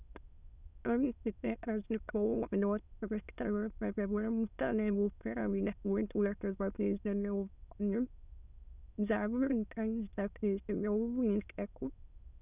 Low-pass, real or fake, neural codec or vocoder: 3.6 kHz; fake; autoencoder, 22.05 kHz, a latent of 192 numbers a frame, VITS, trained on many speakers